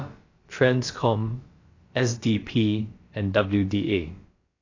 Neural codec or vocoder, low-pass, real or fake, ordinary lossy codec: codec, 16 kHz, about 1 kbps, DyCAST, with the encoder's durations; 7.2 kHz; fake; AAC, 32 kbps